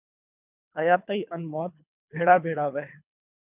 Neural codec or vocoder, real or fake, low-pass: codec, 24 kHz, 3 kbps, HILCodec; fake; 3.6 kHz